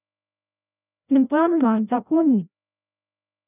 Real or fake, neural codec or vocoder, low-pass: fake; codec, 16 kHz, 0.5 kbps, FreqCodec, larger model; 3.6 kHz